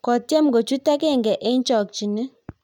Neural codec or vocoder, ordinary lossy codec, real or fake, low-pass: none; none; real; 19.8 kHz